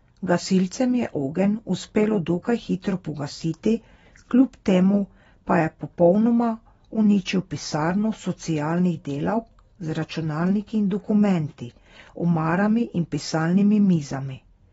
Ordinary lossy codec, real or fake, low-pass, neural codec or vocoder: AAC, 24 kbps; real; 19.8 kHz; none